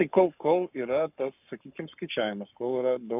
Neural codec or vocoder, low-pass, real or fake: codec, 44.1 kHz, 7.8 kbps, Pupu-Codec; 3.6 kHz; fake